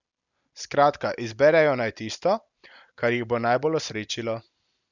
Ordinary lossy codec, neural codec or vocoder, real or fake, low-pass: none; none; real; 7.2 kHz